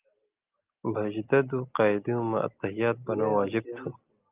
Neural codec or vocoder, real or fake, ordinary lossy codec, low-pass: none; real; Opus, 32 kbps; 3.6 kHz